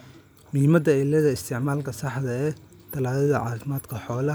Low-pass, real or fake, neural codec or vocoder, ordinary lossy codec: none; real; none; none